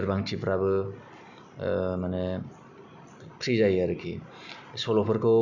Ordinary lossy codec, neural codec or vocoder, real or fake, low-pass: none; none; real; 7.2 kHz